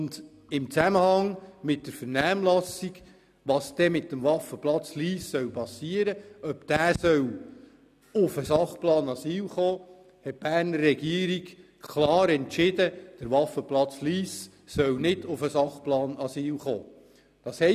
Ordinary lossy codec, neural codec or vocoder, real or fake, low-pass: none; none; real; 14.4 kHz